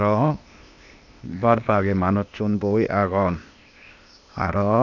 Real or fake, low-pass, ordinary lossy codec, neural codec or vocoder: fake; 7.2 kHz; none; codec, 16 kHz, 0.8 kbps, ZipCodec